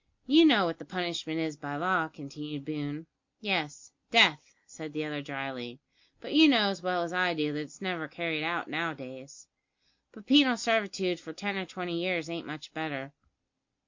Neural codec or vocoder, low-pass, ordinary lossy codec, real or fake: none; 7.2 kHz; MP3, 48 kbps; real